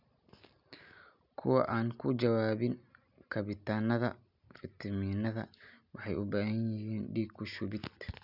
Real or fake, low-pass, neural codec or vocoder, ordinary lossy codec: real; 5.4 kHz; none; none